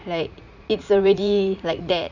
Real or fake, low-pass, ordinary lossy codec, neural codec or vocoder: fake; 7.2 kHz; none; vocoder, 44.1 kHz, 80 mel bands, Vocos